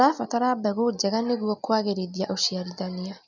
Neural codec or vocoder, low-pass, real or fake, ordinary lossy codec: none; 7.2 kHz; real; none